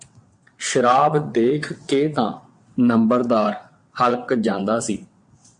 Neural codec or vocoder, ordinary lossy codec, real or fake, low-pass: vocoder, 22.05 kHz, 80 mel bands, WaveNeXt; MP3, 48 kbps; fake; 9.9 kHz